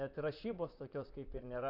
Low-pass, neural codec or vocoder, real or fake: 5.4 kHz; vocoder, 44.1 kHz, 128 mel bands, Pupu-Vocoder; fake